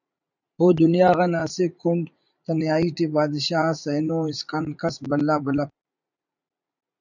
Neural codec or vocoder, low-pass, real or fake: vocoder, 44.1 kHz, 80 mel bands, Vocos; 7.2 kHz; fake